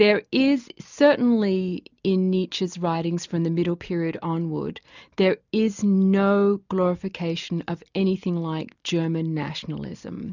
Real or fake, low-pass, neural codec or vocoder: real; 7.2 kHz; none